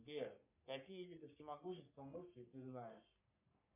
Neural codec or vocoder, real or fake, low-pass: codec, 44.1 kHz, 3.4 kbps, Pupu-Codec; fake; 3.6 kHz